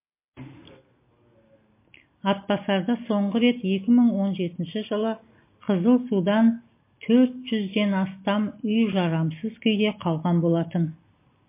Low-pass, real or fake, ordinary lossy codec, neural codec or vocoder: 3.6 kHz; real; MP3, 24 kbps; none